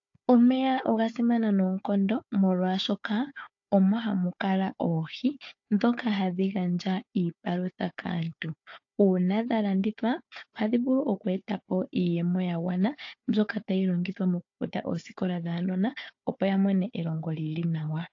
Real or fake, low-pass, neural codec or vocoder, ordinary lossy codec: fake; 7.2 kHz; codec, 16 kHz, 4 kbps, FunCodec, trained on Chinese and English, 50 frames a second; AAC, 48 kbps